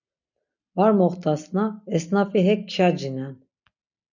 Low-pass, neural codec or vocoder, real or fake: 7.2 kHz; none; real